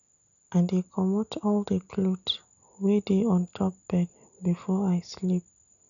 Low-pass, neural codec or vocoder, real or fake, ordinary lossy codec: 7.2 kHz; none; real; none